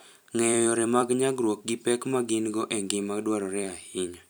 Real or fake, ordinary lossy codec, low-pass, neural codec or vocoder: fake; none; none; vocoder, 44.1 kHz, 128 mel bands every 256 samples, BigVGAN v2